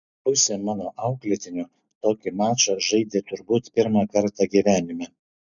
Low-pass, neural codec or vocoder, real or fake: 7.2 kHz; none; real